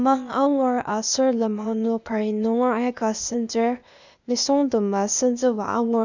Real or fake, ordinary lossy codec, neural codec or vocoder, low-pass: fake; none; codec, 16 kHz, 0.8 kbps, ZipCodec; 7.2 kHz